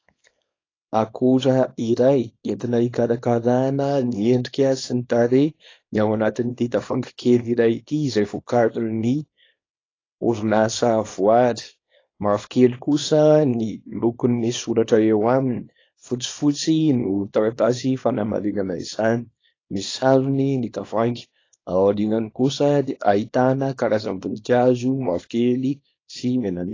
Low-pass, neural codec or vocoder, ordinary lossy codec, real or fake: 7.2 kHz; codec, 24 kHz, 0.9 kbps, WavTokenizer, small release; AAC, 32 kbps; fake